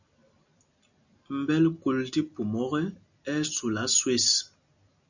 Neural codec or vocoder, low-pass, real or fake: none; 7.2 kHz; real